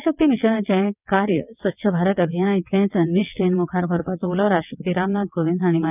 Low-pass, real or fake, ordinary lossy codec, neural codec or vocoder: 3.6 kHz; fake; none; vocoder, 22.05 kHz, 80 mel bands, WaveNeXt